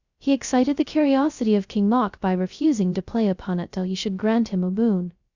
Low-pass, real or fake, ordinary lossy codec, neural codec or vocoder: 7.2 kHz; fake; Opus, 64 kbps; codec, 16 kHz, 0.2 kbps, FocalCodec